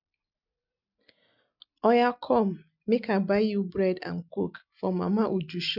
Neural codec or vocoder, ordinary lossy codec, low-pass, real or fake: none; none; 5.4 kHz; real